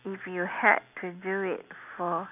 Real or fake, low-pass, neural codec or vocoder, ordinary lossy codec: real; 3.6 kHz; none; none